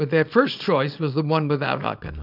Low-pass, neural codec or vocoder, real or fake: 5.4 kHz; codec, 24 kHz, 0.9 kbps, WavTokenizer, small release; fake